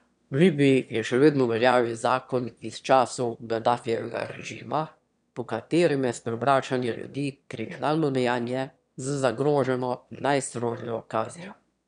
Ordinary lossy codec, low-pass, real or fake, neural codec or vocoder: none; 9.9 kHz; fake; autoencoder, 22.05 kHz, a latent of 192 numbers a frame, VITS, trained on one speaker